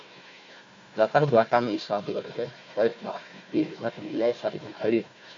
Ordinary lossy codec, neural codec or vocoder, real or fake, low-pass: MP3, 48 kbps; codec, 16 kHz, 1 kbps, FunCodec, trained on Chinese and English, 50 frames a second; fake; 7.2 kHz